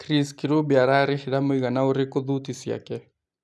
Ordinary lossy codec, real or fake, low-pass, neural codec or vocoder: none; real; none; none